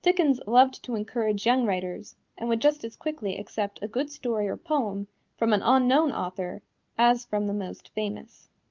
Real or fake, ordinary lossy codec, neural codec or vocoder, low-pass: real; Opus, 24 kbps; none; 7.2 kHz